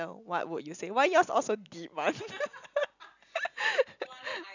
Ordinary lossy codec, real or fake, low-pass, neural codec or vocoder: none; real; 7.2 kHz; none